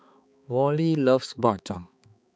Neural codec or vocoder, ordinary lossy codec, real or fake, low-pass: codec, 16 kHz, 4 kbps, X-Codec, HuBERT features, trained on balanced general audio; none; fake; none